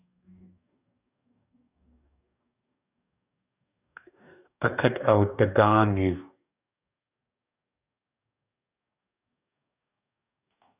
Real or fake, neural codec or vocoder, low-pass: fake; codec, 44.1 kHz, 2.6 kbps, DAC; 3.6 kHz